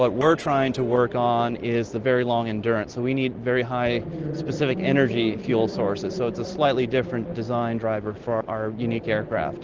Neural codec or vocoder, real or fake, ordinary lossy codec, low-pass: none; real; Opus, 16 kbps; 7.2 kHz